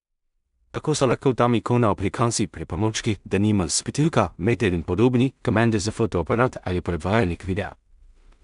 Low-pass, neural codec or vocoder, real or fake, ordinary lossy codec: 10.8 kHz; codec, 16 kHz in and 24 kHz out, 0.4 kbps, LongCat-Audio-Codec, two codebook decoder; fake; none